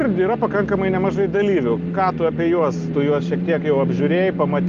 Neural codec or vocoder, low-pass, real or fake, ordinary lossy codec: none; 7.2 kHz; real; Opus, 24 kbps